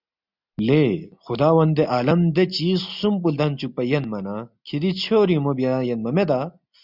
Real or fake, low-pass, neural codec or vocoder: real; 5.4 kHz; none